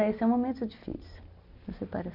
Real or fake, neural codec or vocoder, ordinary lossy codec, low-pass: real; none; none; 5.4 kHz